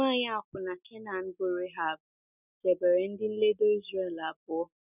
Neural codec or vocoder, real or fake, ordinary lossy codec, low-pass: none; real; none; 3.6 kHz